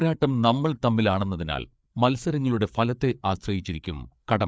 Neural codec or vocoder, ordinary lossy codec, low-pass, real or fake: codec, 16 kHz, 4 kbps, FreqCodec, larger model; none; none; fake